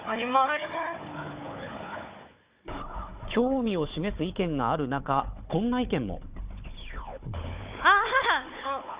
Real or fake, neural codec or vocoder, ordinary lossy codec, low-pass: fake; codec, 16 kHz, 4 kbps, FunCodec, trained on Chinese and English, 50 frames a second; Opus, 64 kbps; 3.6 kHz